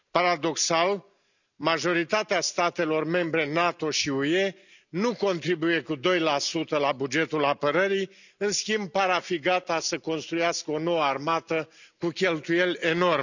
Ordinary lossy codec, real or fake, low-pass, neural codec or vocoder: none; real; 7.2 kHz; none